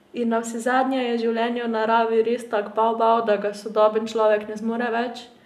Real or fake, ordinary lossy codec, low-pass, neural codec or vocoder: real; none; 14.4 kHz; none